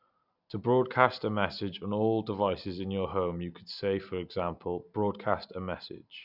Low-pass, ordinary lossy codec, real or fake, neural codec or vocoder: 5.4 kHz; none; real; none